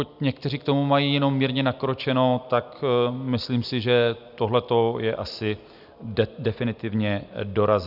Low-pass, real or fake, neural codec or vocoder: 5.4 kHz; real; none